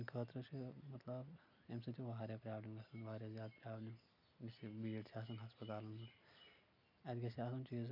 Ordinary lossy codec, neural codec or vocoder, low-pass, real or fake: none; none; 5.4 kHz; real